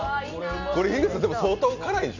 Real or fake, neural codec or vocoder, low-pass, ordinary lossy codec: real; none; 7.2 kHz; none